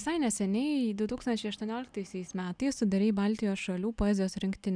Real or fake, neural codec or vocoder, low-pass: real; none; 9.9 kHz